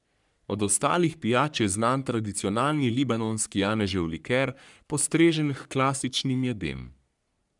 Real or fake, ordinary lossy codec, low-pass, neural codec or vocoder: fake; none; 10.8 kHz; codec, 44.1 kHz, 3.4 kbps, Pupu-Codec